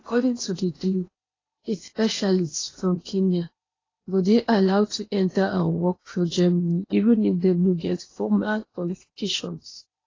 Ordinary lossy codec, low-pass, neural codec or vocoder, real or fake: AAC, 32 kbps; 7.2 kHz; codec, 16 kHz in and 24 kHz out, 0.8 kbps, FocalCodec, streaming, 65536 codes; fake